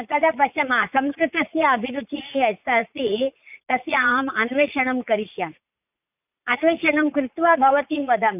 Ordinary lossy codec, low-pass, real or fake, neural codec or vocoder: none; 3.6 kHz; fake; vocoder, 44.1 kHz, 80 mel bands, Vocos